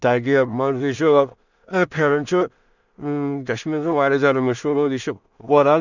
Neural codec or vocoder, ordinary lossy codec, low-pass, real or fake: codec, 16 kHz in and 24 kHz out, 0.4 kbps, LongCat-Audio-Codec, two codebook decoder; none; 7.2 kHz; fake